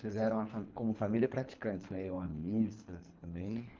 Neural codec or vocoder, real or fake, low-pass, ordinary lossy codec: codec, 24 kHz, 3 kbps, HILCodec; fake; 7.2 kHz; Opus, 24 kbps